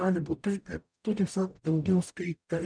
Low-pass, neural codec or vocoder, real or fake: 9.9 kHz; codec, 44.1 kHz, 0.9 kbps, DAC; fake